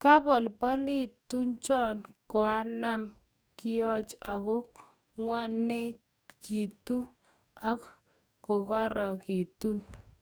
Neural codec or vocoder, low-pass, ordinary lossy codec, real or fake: codec, 44.1 kHz, 2.6 kbps, DAC; none; none; fake